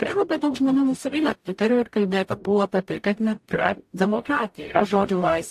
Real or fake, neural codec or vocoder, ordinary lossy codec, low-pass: fake; codec, 44.1 kHz, 0.9 kbps, DAC; AAC, 64 kbps; 14.4 kHz